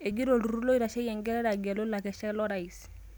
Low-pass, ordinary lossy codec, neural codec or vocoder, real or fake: none; none; none; real